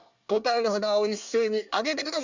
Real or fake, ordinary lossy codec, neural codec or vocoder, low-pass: fake; Opus, 64 kbps; codec, 24 kHz, 1 kbps, SNAC; 7.2 kHz